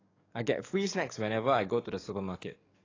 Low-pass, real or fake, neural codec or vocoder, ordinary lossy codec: 7.2 kHz; fake; codec, 44.1 kHz, 7.8 kbps, DAC; AAC, 32 kbps